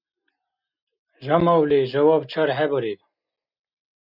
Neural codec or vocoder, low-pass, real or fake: none; 5.4 kHz; real